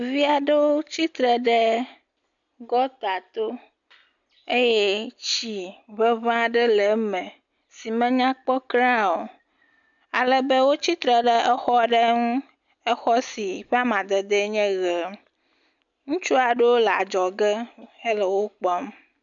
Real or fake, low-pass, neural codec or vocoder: real; 7.2 kHz; none